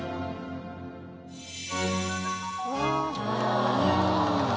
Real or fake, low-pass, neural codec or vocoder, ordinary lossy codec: real; none; none; none